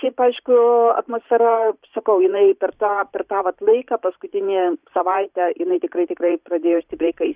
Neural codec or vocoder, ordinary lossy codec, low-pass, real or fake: vocoder, 44.1 kHz, 128 mel bands every 512 samples, BigVGAN v2; Opus, 64 kbps; 3.6 kHz; fake